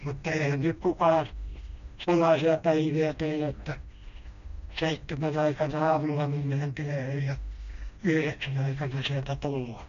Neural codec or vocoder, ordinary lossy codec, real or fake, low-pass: codec, 16 kHz, 1 kbps, FreqCodec, smaller model; none; fake; 7.2 kHz